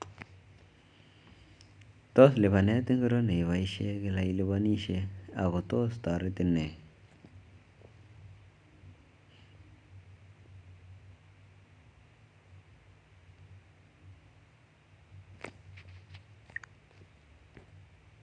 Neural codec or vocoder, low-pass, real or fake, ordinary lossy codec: none; 9.9 kHz; real; none